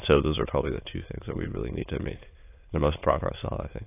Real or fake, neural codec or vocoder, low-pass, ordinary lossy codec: fake; autoencoder, 22.05 kHz, a latent of 192 numbers a frame, VITS, trained on many speakers; 3.6 kHz; AAC, 24 kbps